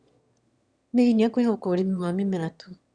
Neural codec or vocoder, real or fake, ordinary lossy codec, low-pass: autoencoder, 22.05 kHz, a latent of 192 numbers a frame, VITS, trained on one speaker; fake; Opus, 64 kbps; 9.9 kHz